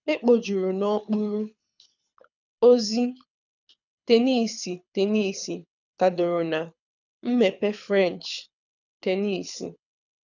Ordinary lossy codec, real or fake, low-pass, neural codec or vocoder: none; fake; 7.2 kHz; codec, 24 kHz, 6 kbps, HILCodec